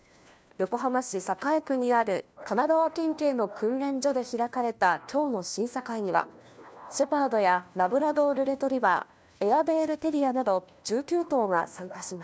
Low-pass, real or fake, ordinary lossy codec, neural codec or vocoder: none; fake; none; codec, 16 kHz, 1 kbps, FunCodec, trained on LibriTTS, 50 frames a second